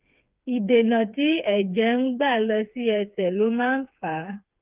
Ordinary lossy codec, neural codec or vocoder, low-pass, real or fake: Opus, 24 kbps; codec, 16 kHz, 4 kbps, FreqCodec, smaller model; 3.6 kHz; fake